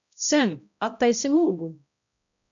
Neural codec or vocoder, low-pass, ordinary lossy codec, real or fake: codec, 16 kHz, 0.5 kbps, X-Codec, HuBERT features, trained on balanced general audio; 7.2 kHz; MP3, 64 kbps; fake